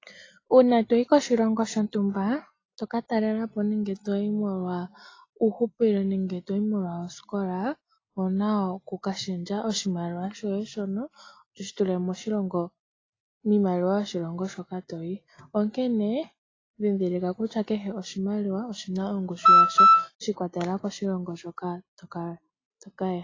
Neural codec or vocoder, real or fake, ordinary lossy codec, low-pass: none; real; AAC, 32 kbps; 7.2 kHz